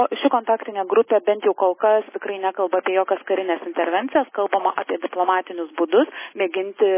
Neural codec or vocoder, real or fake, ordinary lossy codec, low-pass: none; real; MP3, 16 kbps; 3.6 kHz